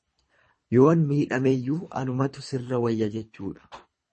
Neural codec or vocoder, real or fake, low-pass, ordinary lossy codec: codec, 24 kHz, 3 kbps, HILCodec; fake; 10.8 kHz; MP3, 32 kbps